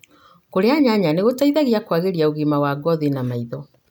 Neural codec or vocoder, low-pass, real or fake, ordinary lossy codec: none; none; real; none